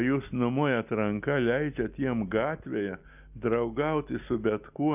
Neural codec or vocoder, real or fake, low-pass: none; real; 3.6 kHz